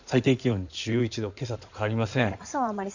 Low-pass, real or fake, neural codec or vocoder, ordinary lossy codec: 7.2 kHz; fake; codec, 16 kHz in and 24 kHz out, 2.2 kbps, FireRedTTS-2 codec; none